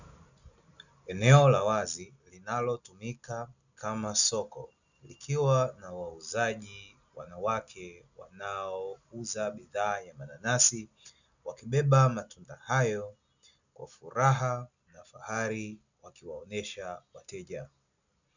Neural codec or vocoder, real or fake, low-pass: none; real; 7.2 kHz